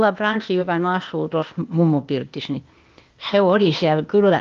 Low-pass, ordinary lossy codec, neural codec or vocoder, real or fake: 7.2 kHz; Opus, 24 kbps; codec, 16 kHz, 0.8 kbps, ZipCodec; fake